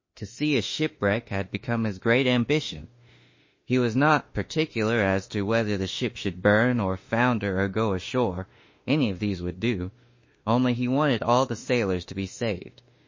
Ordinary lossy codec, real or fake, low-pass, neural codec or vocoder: MP3, 32 kbps; fake; 7.2 kHz; autoencoder, 48 kHz, 32 numbers a frame, DAC-VAE, trained on Japanese speech